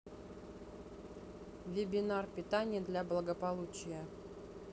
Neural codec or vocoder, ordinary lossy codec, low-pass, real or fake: none; none; none; real